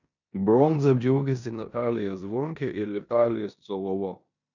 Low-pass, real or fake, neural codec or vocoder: 7.2 kHz; fake; codec, 16 kHz in and 24 kHz out, 0.9 kbps, LongCat-Audio-Codec, four codebook decoder